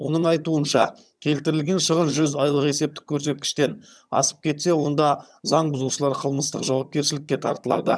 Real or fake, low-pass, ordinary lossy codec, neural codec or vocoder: fake; none; none; vocoder, 22.05 kHz, 80 mel bands, HiFi-GAN